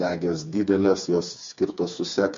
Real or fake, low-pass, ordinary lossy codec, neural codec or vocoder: fake; 7.2 kHz; MP3, 48 kbps; codec, 16 kHz, 4 kbps, FreqCodec, smaller model